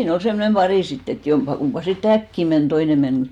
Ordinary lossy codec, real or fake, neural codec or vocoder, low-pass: none; real; none; 19.8 kHz